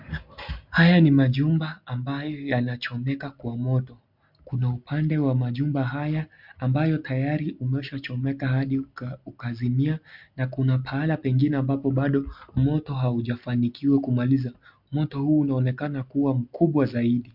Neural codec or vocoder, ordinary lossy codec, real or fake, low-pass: none; MP3, 48 kbps; real; 5.4 kHz